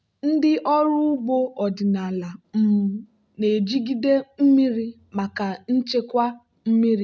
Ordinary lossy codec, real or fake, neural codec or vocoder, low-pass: none; real; none; none